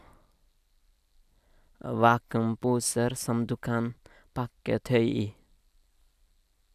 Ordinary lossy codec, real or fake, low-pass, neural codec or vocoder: none; real; 14.4 kHz; none